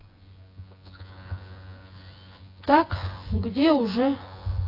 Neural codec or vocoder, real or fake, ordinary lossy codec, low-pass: vocoder, 24 kHz, 100 mel bands, Vocos; fake; AAC, 32 kbps; 5.4 kHz